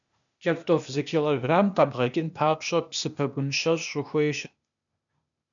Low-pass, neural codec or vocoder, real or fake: 7.2 kHz; codec, 16 kHz, 0.8 kbps, ZipCodec; fake